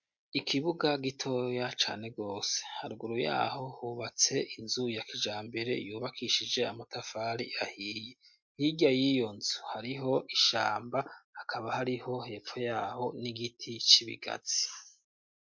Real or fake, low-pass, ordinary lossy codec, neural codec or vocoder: real; 7.2 kHz; MP3, 48 kbps; none